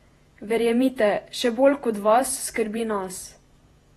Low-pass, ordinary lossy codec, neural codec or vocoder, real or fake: 19.8 kHz; AAC, 32 kbps; vocoder, 48 kHz, 128 mel bands, Vocos; fake